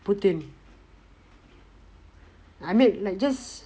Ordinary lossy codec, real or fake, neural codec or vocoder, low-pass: none; real; none; none